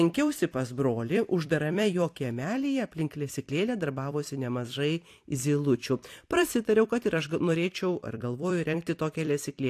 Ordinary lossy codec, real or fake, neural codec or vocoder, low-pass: AAC, 64 kbps; fake; vocoder, 44.1 kHz, 128 mel bands every 256 samples, BigVGAN v2; 14.4 kHz